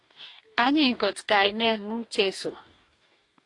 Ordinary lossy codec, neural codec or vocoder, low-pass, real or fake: AAC, 48 kbps; codec, 44.1 kHz, 2.6 kbps, DAC; 10.8 kHz; fake